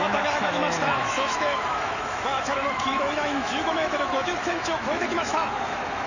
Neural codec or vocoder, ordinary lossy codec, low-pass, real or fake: autoencoder, 48 kHz, 128 numbers a frame, DAC-VAE, trained on Japanese speech; none; 7.2 kHz; fake